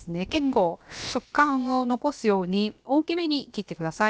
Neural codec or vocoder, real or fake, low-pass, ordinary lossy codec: codec, 16 kHz, about 1 kbps, DyCAST, with the encoder's durations; fake; none; none